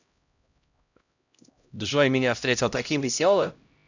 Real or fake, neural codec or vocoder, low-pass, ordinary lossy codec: fake; codec, 16 kHz, 0.5 kbps, X-Codec, HuBERT features, trained on LibriSpeech; 7.2 kHz; none